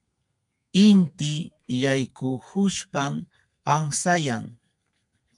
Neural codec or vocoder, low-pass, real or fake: codec, 32 kHz, 1.9 kbps, SNAC; 10.8 kHz; fake